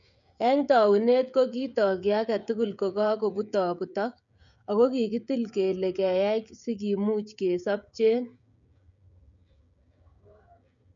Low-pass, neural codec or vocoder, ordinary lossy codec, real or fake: 7.2 kHz; codec, 16 kHz, 16 kbps, FreqCodec, smaller model; none; fake